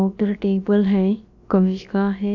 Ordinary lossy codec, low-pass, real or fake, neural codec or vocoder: AAC, 48 kbps; 7.2 kHz; fake; codec, 16 kHz, about 1 kbps, DyCAST, with the encoder's durations